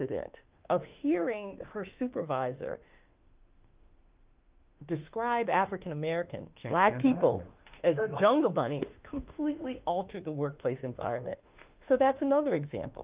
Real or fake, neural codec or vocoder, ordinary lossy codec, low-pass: fake; autoencoder, 48 kHz, 32 numbers a frame, DAC-VAE, trained on Japanese speech; Opus, 24 kbps; 3.6 kHz